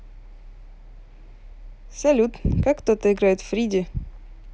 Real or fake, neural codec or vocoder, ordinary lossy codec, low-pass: real; none; none; none